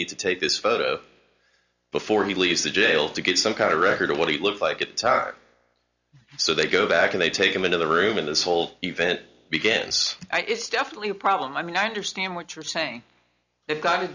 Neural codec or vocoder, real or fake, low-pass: none; real; 7.2 kHz